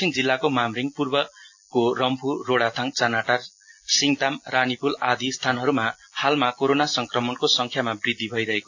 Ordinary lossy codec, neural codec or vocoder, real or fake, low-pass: AAC, 48 kbps; none; real; 7.2 kHz